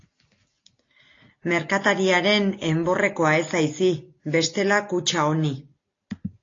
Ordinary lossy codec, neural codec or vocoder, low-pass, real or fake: AAC, 32 kbps; none; 7.2 kHz; real